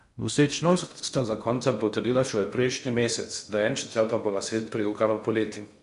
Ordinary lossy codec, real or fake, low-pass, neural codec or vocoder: MP3, 96 kbps; fake; 10.8 kHz; codec, 16 kHz in and 24 kHz out, 0.6 kbps, FocalCodec, streaming, 4096 codes